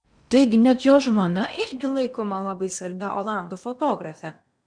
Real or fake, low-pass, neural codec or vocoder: fake; 9.9 kHz; codec, 16 kHz in and 24 kHz out, 0.8 kbps, FocalCodec, streaming, 65536 codes